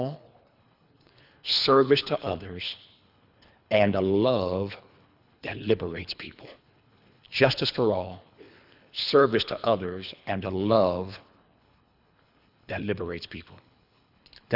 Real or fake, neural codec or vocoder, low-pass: fake; codec, 24 kHz, 3 kbps, HILCodec; 5.4 kHz